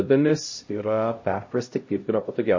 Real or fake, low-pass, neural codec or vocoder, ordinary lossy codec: fake; 7.2 kHz; codec, 16 kHz, 0.5 kbps, X-Codec, HuBERT features, trained on LibriSpeech; MP3, 32 kbps